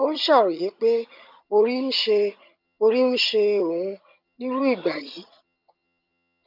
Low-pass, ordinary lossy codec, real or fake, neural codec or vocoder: 5.4 kHz; none; fake; vocoder, 22.05 kHz, 80 mel bands, HiFi-GAN